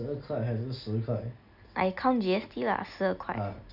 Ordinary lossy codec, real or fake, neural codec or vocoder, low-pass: none; real; none; 5.4 kHz